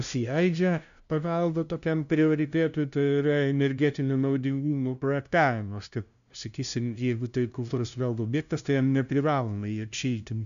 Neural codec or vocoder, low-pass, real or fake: codec, 16 kHz, 0.5 kbps, FunCodec, trained on LibriTTS, 25 frames a second; 7.2 kHz; fake